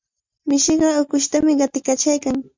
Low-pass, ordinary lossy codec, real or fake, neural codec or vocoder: 7.2 kHz; MP3, 64 kbps; real; none